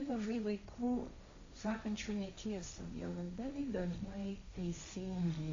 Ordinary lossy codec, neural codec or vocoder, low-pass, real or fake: AAC, 48 kbps; codec, 16 kHz, 1.1 kbps, Voila-Tokenizer; 7.2 kHz; fake